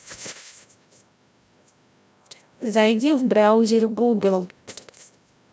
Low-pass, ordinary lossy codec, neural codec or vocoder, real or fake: none; none; codec, 16 kHz, 0.5 kbps, FreqCodec, larger model; fake